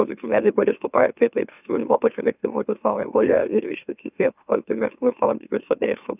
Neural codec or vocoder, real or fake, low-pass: autoencoder, 44.1 kHz, a latent of 192 numbers a frame, MeloTTS; fake; 3.6 kHz